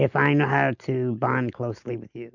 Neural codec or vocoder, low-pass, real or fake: none; 7.2 kHz; real